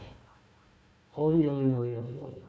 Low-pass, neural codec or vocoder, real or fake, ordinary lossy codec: none; codec, 16 kHz, 1 kbps, FunCodec, trained on Chinese and English, 50 frames a second; fake; none